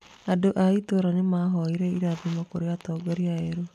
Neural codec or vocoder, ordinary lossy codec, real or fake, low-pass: none; none; real; 14.4 kHz